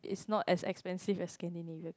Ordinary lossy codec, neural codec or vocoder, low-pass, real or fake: none; none; none; real